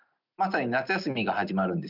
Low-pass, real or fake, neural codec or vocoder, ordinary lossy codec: 5.4 kHz; real; none; none